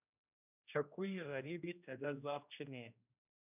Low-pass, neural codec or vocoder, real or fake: 3.6 kHz; codec, 16 kHz, 1 kbps, X-Codec, HuBERT features, trained on general audio; fake